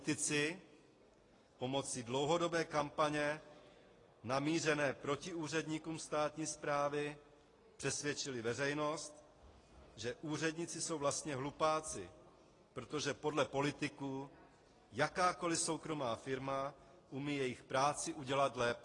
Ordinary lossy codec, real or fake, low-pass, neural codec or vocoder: AAC, 32 kbps; real; 10.8 kHz; none